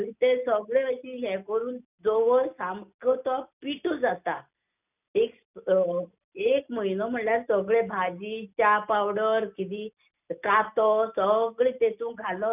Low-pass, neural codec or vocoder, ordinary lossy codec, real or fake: 3.6 kHz; none; none; real